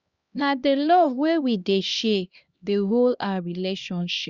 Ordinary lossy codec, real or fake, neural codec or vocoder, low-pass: Opus, 64 kbps; fake; codec, 16 kHz, 1 kbps, X-Codec, HuBERT features, trained on LibriSpeech; 7.2 kHz